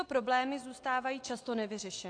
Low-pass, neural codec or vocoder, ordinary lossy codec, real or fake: 9.9 kHz; none; AAC, 48 kbps; real